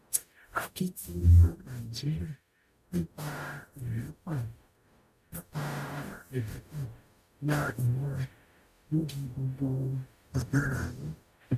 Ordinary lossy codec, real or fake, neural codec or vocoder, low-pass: none; fake; codec, 44.1 kHz, 0.9 kbps, DAC; 14.4 kHz